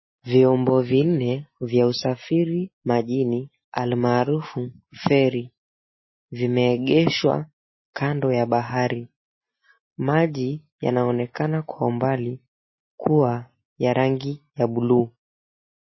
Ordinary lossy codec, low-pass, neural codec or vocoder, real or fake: MP3, 24 kbps; 7.2 kHz; none; real